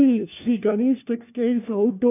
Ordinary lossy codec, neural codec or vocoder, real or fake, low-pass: none; codec, 16 kHz, 1 kbps, FunCodec, trained on LibriTTS, 50 frames a second; fake; 3.6 kHz